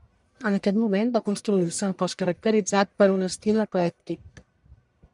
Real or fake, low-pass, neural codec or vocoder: fake; 10.8 kHz; codec, 44.1 kHz, 1.7 kbps, Pupu-Codec